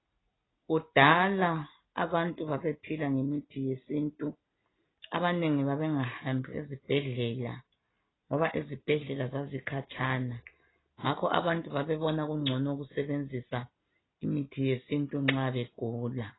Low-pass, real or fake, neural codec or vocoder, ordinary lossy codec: 7.2 kHz; real; none; AAC, 16 kbps